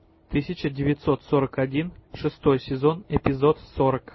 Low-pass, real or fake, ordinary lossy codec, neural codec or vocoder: 7.2 kHz; real; MP3, 24 kbps; none